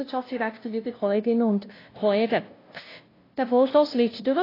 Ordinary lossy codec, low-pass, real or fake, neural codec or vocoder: AAC, 24 kbps; 5.4 kHz; fake; codec, 16 kHz, 0.5 kbps, FunCodec, trained on LibriTTS, 25 frames a second